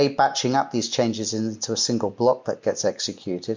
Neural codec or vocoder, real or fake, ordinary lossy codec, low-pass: none; real; MP3, 48 kbps; 7.2 kHz